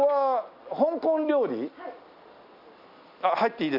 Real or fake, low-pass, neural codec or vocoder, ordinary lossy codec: real; 5.4 kHz; none; none